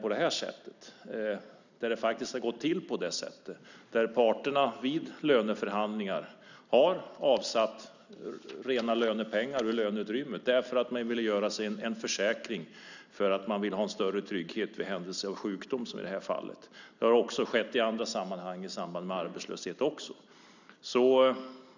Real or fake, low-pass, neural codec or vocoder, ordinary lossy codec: real; 7.2 kHz; none; none